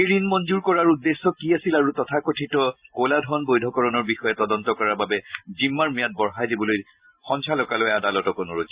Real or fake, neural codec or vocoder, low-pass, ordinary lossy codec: real; none; 3.6 kHz; Opus, 64 kbps